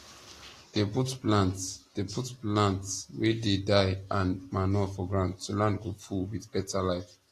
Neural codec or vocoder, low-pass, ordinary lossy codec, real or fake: none; 14.4 kHz; AAC, 48 kbps; real